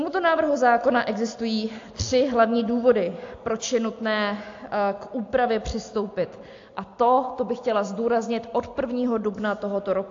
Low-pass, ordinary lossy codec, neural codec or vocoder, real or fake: 7.2 kHz; AAC, 48 kbps; none; real